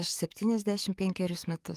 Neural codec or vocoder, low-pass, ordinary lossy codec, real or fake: codec, 44.1 kHz, 7.8 kbps, DAC; 14.4 kHz; Opus, 32 kbps; fake